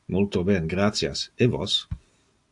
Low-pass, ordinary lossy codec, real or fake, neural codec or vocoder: 10.8 kHz; AAC, 64 kbps; real; none